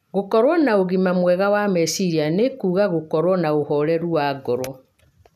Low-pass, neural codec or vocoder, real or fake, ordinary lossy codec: 14.4 kHz; none; real; none